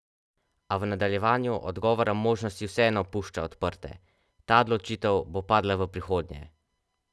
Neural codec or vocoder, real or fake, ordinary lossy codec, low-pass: none; real; none; none